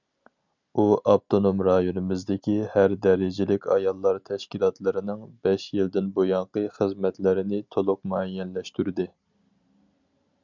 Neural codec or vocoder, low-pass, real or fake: none; 7.2 kHz; real